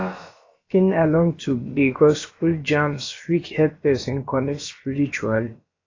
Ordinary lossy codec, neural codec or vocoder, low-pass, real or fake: AAC, 32 kbps; codec, 16 kHz, about 1 kbps, DyCAST, with the encoder's durations; 7.2 kHz; fake